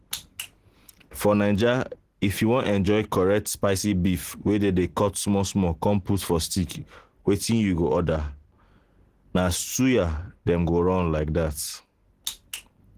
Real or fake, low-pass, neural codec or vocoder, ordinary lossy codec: real; 14.4 kHz; none; Opus, 16 kbps